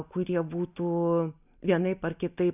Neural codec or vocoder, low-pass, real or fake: none; 3.6 kHz; real